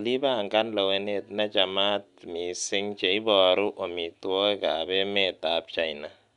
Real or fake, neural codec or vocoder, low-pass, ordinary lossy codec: real; none; 10.8 kHz; none